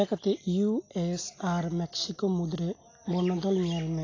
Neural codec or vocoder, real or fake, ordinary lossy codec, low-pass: none; real; AAC, 32 kbps; 7.2 kHz